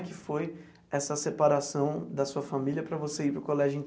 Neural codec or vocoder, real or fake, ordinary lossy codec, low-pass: none; real; none; none